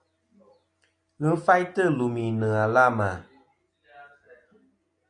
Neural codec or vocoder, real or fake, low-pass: none; real; 9.9 kHz